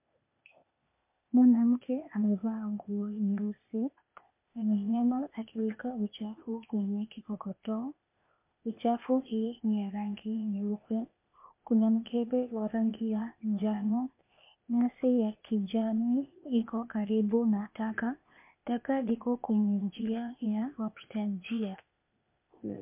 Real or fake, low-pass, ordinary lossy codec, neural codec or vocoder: fake; 3.6 kHz; MP3, 32 kbps; codec, 16 kHz, 0.8 kbps, ZipCodec